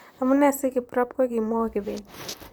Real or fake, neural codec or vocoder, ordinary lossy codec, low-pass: fake; vocoder, 44.1 kHz, 128 mel bands, Pupu-Vocoder; none; none